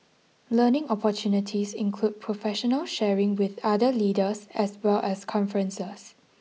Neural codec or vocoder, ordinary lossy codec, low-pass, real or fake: none; none; none; real